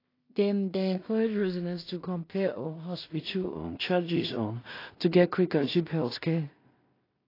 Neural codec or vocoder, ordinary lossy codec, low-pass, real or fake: codec, 16 kHz in and 24 kHz out, 0.4 kbps, LongCat-Audio-Codec, two codebook decoder; AAC, 32 kbps; 5.4 kHz; fake